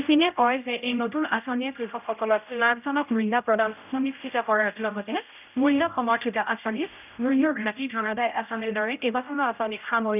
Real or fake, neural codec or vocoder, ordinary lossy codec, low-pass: fake; codec, 16 kHz, 0.5 kbps, X-Codec, HuBERT features, trained on general audio; none; 3.6 kHz